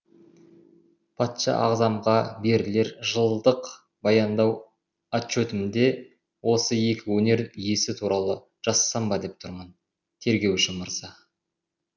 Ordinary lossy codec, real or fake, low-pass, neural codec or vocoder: none; real; 7.2 kHz; none